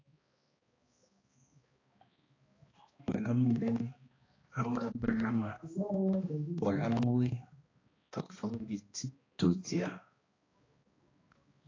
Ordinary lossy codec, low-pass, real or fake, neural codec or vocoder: AAC, 32 kbps; 7.2 kHz; fake; codec, 16 kHz, 1 kbps, X-Codec, HuBERT features, trained on balanced general audio